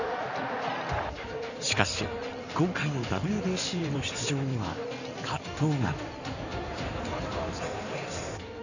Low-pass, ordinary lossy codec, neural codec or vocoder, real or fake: 7.2 kHz; none; codec, 16 kHz in and 24 kHz out, 2.2 kbps, FireRedTTS-2 codec; fake